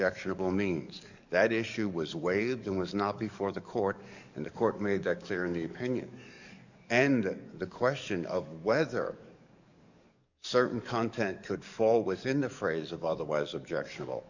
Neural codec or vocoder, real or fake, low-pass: codec, 44.1 kHz, 7.8 kbps, DAC; fake; 7.2 kHz